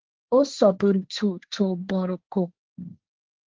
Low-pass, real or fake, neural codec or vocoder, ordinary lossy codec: 7.2 kHz; fake; codec, 16 kHz, 1.1 kbps, Voila-Tokenizer; Opus, 16 kbps